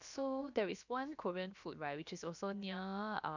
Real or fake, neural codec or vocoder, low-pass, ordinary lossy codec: fake; codec, 16 kHz, about 1 kbps, DyCAST, with the encoder's durations; 7.2 kHz; none